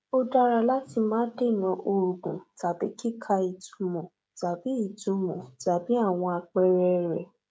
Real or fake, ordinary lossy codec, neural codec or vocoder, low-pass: fake; none; codec, 16 kHz, 16 kbps, FreqCodec, smaller model; none